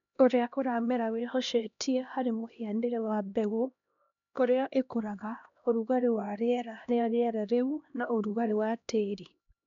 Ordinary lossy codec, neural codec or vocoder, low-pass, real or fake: none; codec, 16 kHz, 1 kbps, X-Codec, HuBERT features, trained on LibriSpeech; 7.2 kHz; fake